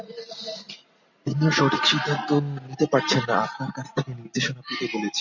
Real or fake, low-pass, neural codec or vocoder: real; 7.2 kHz; none